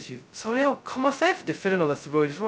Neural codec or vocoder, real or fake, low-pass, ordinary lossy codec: codec, 16 kHz, 0.2 kbps, FocalCodec; fake; none; none